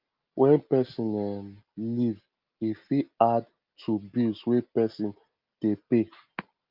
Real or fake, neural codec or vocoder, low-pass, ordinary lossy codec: real; none; 5.4 kHz; Opus, 24 kbps